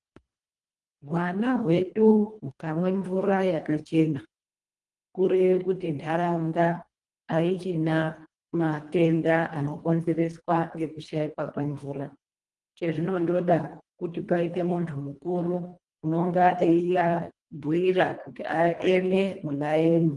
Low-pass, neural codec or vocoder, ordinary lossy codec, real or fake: 10.8 kHz; codec, 24 kHz, 1.5 kbps, HILCodec; Opus, 24 kbps; fake